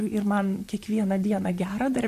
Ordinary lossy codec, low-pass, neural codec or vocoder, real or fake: MP3, 64 kbps; 14.4 kHz; vocoder, 44.1 kHz, 128 mel bands, Pupu-Vocoder; fake